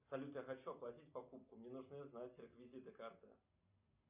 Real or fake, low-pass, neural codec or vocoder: real; 3.6 kHz; none